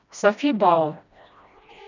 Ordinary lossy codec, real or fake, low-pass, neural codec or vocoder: none; fake; 7.2 kHz; codec, 16 kHz, 1 kbps, FreqCodec, smaller model